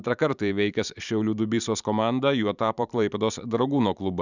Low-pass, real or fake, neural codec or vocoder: 7.2 kHz; fake; vocoder, 44.1 kHz, 128 mel bands every 512 samples, BigVGAN v2